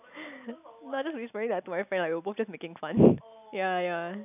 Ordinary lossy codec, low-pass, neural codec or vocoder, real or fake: none; 3.6 kHz; none; real